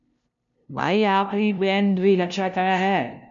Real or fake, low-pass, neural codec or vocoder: fake; 7.2 kHz; codec, 16 kHz, 0.5 kbps, FunCodec, trained on LibriTTS, 25 frames a second